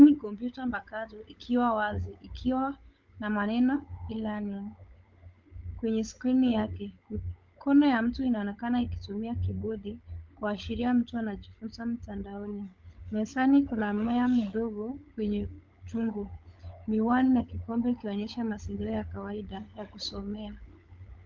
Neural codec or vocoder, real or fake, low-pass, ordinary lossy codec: codec, 16 kHz, 16 kbps, FunCodec, trained on LibriTTS, 50 frames a second; fake; 7.2 kHz; Opus, 24 kbps